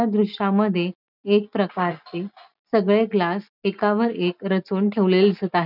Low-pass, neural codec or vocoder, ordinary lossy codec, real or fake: 5.4 kHz; none; none; real